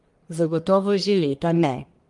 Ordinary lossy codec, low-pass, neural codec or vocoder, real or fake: Opus, 32 kbps; 10.8 kHz; codec, 44.1 kHz, 1.7 kbps, Pupu-Codec; fake